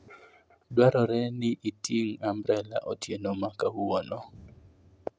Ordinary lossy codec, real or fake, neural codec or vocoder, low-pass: none; real; none; none